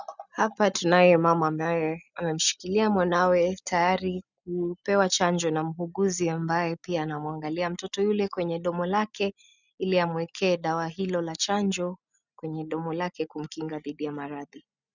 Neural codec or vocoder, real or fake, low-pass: none; real; 7.2 kHz